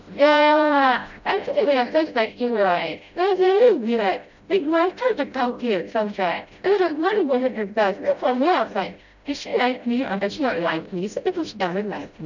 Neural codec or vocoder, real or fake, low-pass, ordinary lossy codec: codec, 16 kHz, 0.5 kbps, FreqCodec, smaller model; fake; 7.2 kHz; none